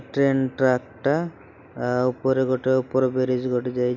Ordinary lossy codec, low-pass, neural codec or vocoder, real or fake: none; 7.2 kHz; none; real